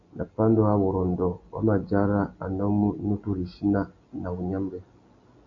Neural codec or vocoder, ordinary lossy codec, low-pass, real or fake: none; AAC, 48 kbps; 7.2 kHz; real